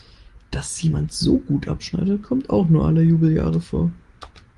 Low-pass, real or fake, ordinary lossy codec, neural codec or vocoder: 10.8 kHz; real; Opus, 24 kbps; none